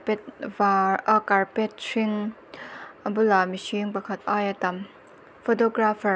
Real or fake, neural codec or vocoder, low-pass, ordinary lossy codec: real; none; none; none